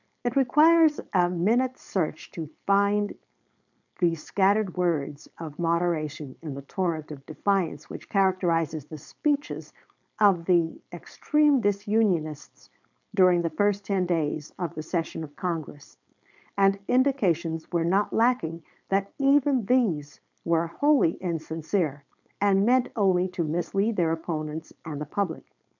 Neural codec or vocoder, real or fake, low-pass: codec, 16 kHz, 4.8 kbps, FACodec; fake; 7.2 kHz